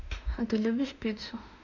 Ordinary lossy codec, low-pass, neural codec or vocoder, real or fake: none; 7.2 kHz; autoencoder, 48 kHz, 32 numbers a frame, DAC-VAE, trained on Japanese speech; fake